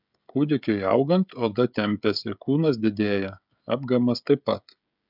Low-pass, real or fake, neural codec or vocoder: 5.4 kHz; fake; codec, 16 kHz, 16 kbps, FreqCodec, smaller model